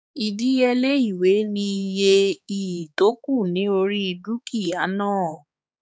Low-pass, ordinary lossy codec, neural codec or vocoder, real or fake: none; none; codec, 16 kHz, 4 kbps, X-Codec, HuBERT features, trained on balanced general audio; fake